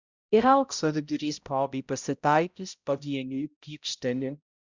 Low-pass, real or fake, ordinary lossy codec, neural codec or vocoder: 7.2 kHz; fake; Opus, 64 kbps; codec, 16 kHz, 0.5 kbps, X-Codec, HuBERT features, trained on balanced general audio